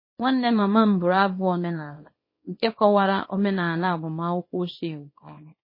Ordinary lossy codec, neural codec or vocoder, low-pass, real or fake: MP3, 24 kbps; codec, 24 kHz, 0.9 kbps, WavTokenizer, small release; 5.4 kHz; fake